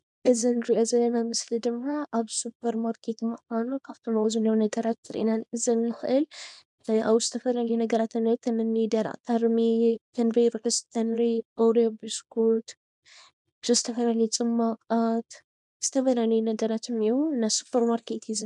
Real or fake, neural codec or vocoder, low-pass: fake; codec, 24 kHz, 0.9 kbps, WavTokenizer, small release; 10.8 kHz